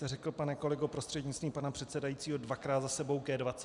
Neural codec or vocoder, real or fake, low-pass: none; real; 10.8 kHz